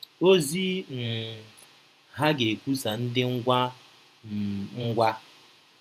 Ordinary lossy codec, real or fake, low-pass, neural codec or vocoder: none; fake; 14.4 kHz; vocoder, 48 kHz, 128 mel bands, Vocos